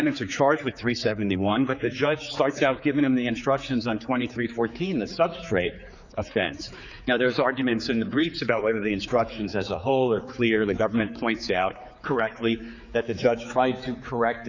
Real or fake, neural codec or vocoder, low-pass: fake; codec, 16 kHz, 4 kbps, X-Codec, HuBERT features, trained on general audio; 7.2 kHz